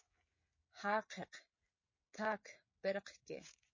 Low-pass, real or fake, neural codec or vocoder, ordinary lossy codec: 7.2 kHz; fake; codec, 16 kHz, 16 kbps, FreqCodec, smaller model; MP3, 32 kbps